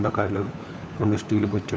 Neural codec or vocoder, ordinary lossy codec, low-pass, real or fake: codec, 16 kHz, 4 kbps, FunCodec, trained on LibriTTS, 50 frames a second; none; none; fake